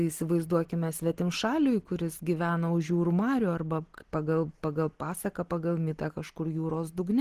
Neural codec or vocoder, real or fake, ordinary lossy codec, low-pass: none; real; Opus, 16 kbps; 14.4 kHz